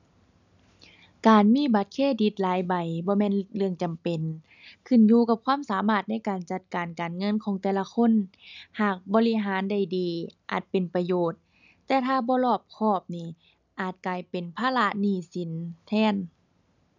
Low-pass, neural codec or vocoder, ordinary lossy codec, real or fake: 7.2 kHz; none; none; real